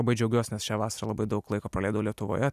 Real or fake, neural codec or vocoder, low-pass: real; none; 14.4 kHz